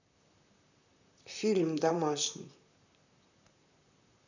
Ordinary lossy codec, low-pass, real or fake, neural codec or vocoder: none; 7.2 kHz; fake; vocoder, 44.1 kHz, 128 mel bands, Pupu-Vocoder